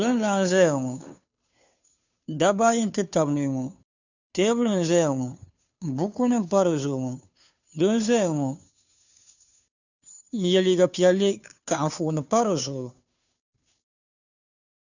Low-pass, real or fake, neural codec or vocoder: 7.2 kHz; fake; codec, 16 kHz, 2 kbps, FunCodec, trained on Chinese and English, 25 frames a second